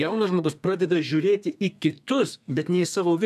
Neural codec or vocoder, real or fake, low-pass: codec, 32 kHz, 1.9 kbps, SNAC; fake; 14.4 kHz